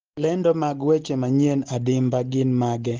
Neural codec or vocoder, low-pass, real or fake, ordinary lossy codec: none; 7.2 kHz; real; Opus, 16 kbps